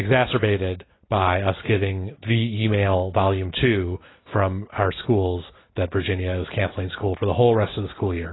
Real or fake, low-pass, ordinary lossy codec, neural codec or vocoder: real; 7.2 kHz; AAC, 16 kbps; none